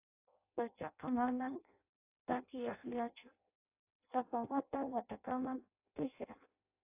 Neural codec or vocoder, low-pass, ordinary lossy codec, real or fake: codec, 16 kHz in and 24 kHz out, 0.6 kbps, FireRedTTS-2 codec; 3.6 kHz; AAC, 32 kbps; fake